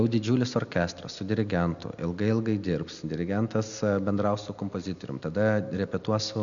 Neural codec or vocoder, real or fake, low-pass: none; real; 7.2 kHz